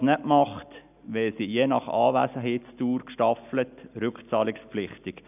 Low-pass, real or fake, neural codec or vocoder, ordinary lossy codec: 3.6 kHz; real; none; none